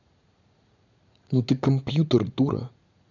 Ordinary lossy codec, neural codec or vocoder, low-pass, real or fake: none; vocoder, 44.1 kHz, 80 mel bands, Vocos; 7.2 kHz; fake